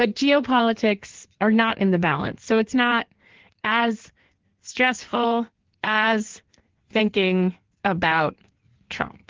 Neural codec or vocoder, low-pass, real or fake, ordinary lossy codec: codec, 16 kHz in and 24 kHz out, 1.1 kbps, FireRedTTS-2 codec; 7.2 kHz; fake; Opus, 16 kbps